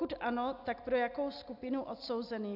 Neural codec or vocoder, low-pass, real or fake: none; 5.4 kHz; real